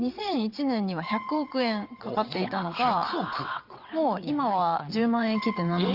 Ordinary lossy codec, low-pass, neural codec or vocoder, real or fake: Opus, 64 kbps; 5.4 kHz; vocoder, 22.05 kHz, 80 mel bands, WaveNeXt; fake